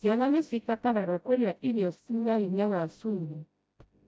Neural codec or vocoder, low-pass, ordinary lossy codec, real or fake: codec, 16 kHz, 0.5 kbps, FreqCodec, smaller model; none; none; fake